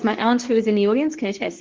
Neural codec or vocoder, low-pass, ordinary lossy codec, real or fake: codec, 24 kHz, 0.9 kbps, WavTokenizer, medium speech release version 2; 7.2 kHz; Opus, 16 kbps; fake